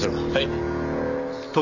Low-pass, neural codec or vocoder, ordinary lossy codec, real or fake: 7.2 kHz; none; none; real